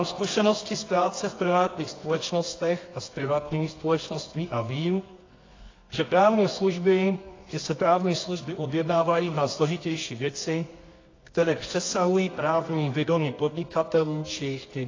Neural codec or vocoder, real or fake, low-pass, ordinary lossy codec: codec, 24 kHz, 0.9 kbps, WavTokenizer, medium music audio release; fake; 7.2 kHz; AAC, 32 kbps